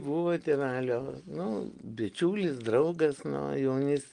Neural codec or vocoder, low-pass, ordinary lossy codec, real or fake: none; 9.9 kHz; Opus, 24 kbps; real